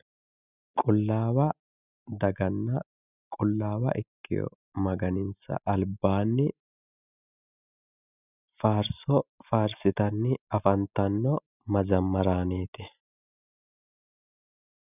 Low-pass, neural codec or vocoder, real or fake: 3.6 kHz; none; real